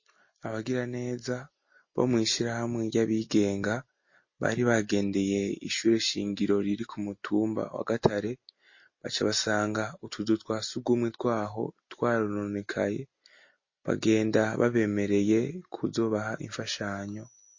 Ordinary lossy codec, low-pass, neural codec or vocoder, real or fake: MP3, 32 kbps; 7.2 kHz; none; real